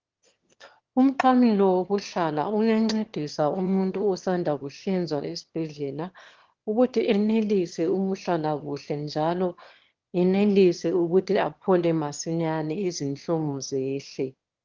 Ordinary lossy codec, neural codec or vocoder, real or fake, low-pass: Opus, 16 kbps; autoencoder, 22.05 kHz, a latent of 192 numbers a frame, VITS, trained on one speaker; fake; 7.2 kHz